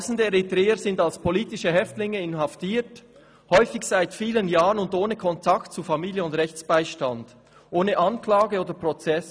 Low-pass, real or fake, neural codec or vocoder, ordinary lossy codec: none; real; none; none